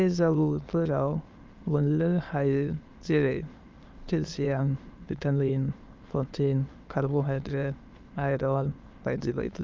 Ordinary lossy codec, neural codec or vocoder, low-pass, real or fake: Opus, 32 kbps; autoencoder, 22.05 kHz, a latent of 192 numbers a frame, VITS, trained on many speakers; 7.2 kHz; fake